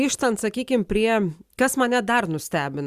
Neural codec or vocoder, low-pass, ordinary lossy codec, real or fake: none; 14.4 kHz; Opus, 64 kbps; real